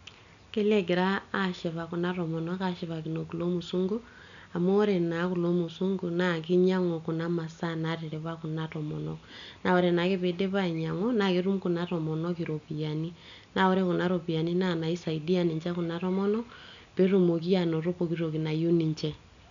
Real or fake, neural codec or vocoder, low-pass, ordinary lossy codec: real; none; 7.2 kHz; none